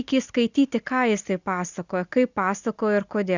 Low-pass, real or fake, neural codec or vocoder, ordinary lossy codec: 7.2 kHz; real; none; Opus, 64 kbps